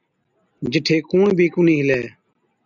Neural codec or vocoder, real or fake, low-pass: none; real; 7.2 kHz